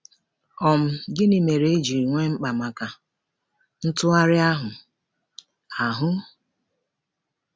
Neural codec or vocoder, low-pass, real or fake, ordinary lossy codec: none; none; real; none